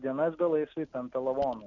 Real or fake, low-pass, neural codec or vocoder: real; 7.2 kHz; none